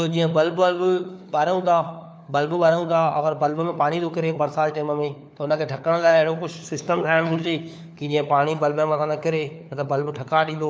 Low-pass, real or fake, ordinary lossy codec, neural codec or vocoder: none; fake; none; codec, 16 kHz, 4 kbps, FunCodec, trained on LibriTTS, 50 frames a second